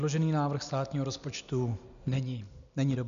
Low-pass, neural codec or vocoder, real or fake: 7.2 kHz; none; real